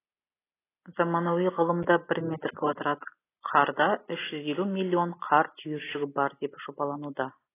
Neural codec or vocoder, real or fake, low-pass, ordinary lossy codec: none; real; 3.6 kHz; AAC, 16 kbps